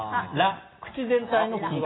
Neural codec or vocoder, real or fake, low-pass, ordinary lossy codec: none; real; 7.2 kHz; AAC, 16 kbps